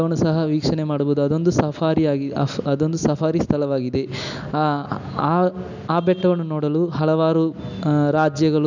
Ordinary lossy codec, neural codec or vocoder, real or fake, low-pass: none; none; real; 7.2 kHz